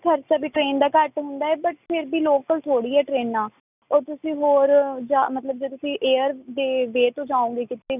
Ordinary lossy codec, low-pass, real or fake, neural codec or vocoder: none; 3.6 kHz; real; none